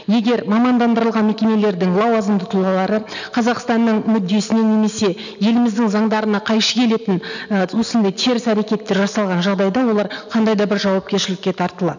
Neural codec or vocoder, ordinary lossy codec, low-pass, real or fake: none; none; 7.2 kHz; real